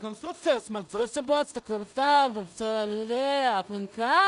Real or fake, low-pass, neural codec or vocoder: fake; 10.8 kHz; codec, 16 kHz in and 24 kHz out, 0.4 kbps, LongCat-Audio-Codec, two codebook decoder